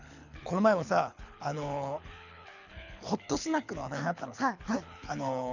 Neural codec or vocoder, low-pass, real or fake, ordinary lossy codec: codec, 24 kHz, 6 kbps, HILCodec; 7.2 kHz; fake; none